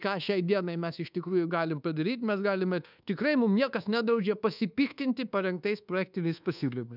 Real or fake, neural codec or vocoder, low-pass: fake; codec, 24 kHz, 1.2 kbps, DualCodec; 5.4 kHz